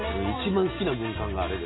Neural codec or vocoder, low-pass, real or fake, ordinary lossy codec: none; 7.2 kHz; real; AAC, 16 kbps